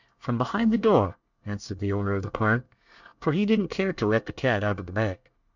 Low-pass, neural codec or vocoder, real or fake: 7.2 kHz; codec, 24 kHz, 1 kbps, SNAC; fake